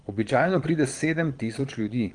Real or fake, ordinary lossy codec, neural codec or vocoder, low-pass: fake; Opus, 24 kbps; vocoder, 22.05 kHz, 80 mel bands, Vocos; 9.9 kHz